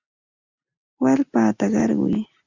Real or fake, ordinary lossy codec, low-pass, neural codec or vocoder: real; Opus, 64 kbps; 7.2 kHz; none